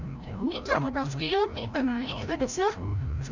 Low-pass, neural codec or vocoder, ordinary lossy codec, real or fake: 7.2 kHz; codec, 16 kHz, 0.5 kbps, FreqCodec, larger model; none; fake